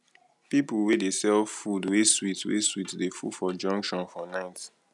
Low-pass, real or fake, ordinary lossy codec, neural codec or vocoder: 10.8 kHz; real; none; none